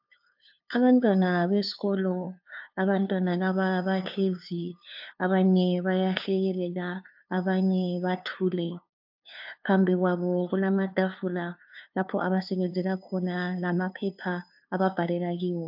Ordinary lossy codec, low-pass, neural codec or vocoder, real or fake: AAC, 48 kbps; 5.4 kHz; codec, 16 kHz, 2 kbps, FunCodec, trained on LibriTTS, 25 frames a second; fake